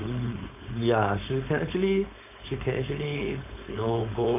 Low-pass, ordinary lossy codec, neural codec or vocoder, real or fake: 3.6 kHz; none; codec, 16 kHz, 4.8 kbps, FACodec; fake